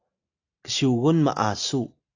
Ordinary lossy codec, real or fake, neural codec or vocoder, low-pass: AAC, 48 kbps; real; none; 7.2 kHz